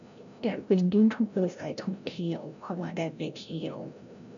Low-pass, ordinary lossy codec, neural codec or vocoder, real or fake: 7.2 kHz; none; codec, 16 kHz, 0.5 kbps, FreqCodec, larger model; fake